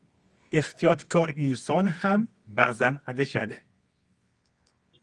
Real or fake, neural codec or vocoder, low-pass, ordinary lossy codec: fake; codec, 24 kHz, 0.9 kbps, WavTokenizer, medium music audio release; 10.8 kHz; Opus, 32 kbps